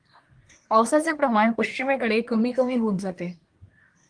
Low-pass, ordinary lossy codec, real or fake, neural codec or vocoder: 9.9 kHz; Opus, 24 kbps; fake; codec, 24 kHz, 1 kbps, SNAC